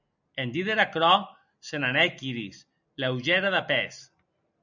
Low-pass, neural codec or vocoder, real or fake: 7.2 kHz; none; real